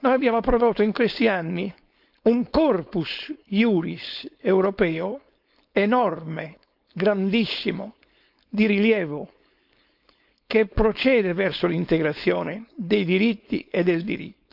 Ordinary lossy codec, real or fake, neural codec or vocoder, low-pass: none; fake; codec, 16 kHz, 4.8 kbps, FACodec; 5.4 kHz